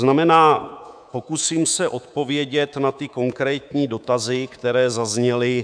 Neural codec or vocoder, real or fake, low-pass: codec, 24 kHz, 3.1 kbps, DualCodec; fake; 9.9 kHz